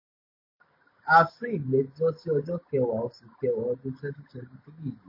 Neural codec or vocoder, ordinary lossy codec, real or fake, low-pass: none; MP3, 24 kbps; real; 5.4 kHz